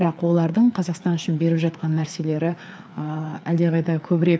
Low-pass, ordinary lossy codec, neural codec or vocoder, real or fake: none; none; codec, 16 kHz, 4 kbps, FreqCodec, larger model; fake